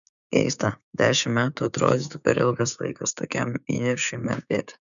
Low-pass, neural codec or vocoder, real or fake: 7.2 kHz; codec, 16 kHz, 6 kbps, DAC; fake